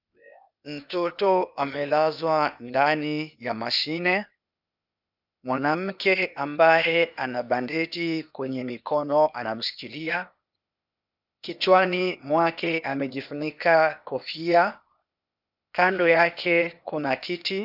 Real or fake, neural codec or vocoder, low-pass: fake; codec, 16 kHz, 0.8 kbps, ZipCodec; 5.4 kHz